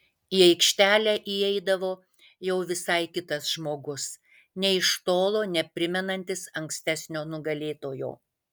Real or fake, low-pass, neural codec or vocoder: real; 19.8 kHz; none